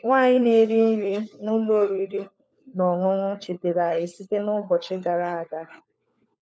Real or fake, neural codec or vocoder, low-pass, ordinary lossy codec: fake; codec, 16 kHz, 4 kbps, FunCodec, trained on LibriTTS, 50 frames a second; none; none